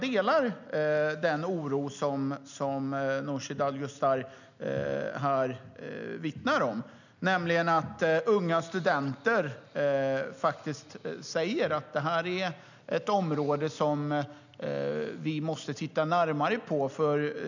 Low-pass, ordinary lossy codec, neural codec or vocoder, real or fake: 7.2 kHz; none; none; real